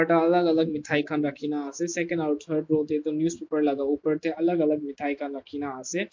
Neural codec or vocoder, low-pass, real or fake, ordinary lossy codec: none; 7.2 kHz; real; MP3, 48 kbps